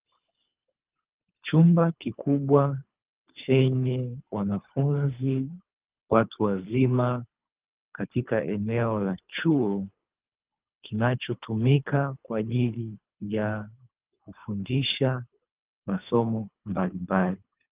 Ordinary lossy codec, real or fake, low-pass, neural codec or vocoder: Opus, 24 kbps; fake; 3.6 kHz; codec, 24 kHz, 3 kbps, HILCodec